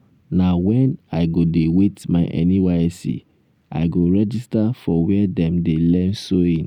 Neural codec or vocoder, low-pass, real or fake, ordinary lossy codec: none; 19.8 kHz; real; none